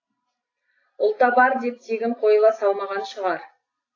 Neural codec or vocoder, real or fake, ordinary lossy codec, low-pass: none; real; AAC, 32 kbps; 7.2 kHz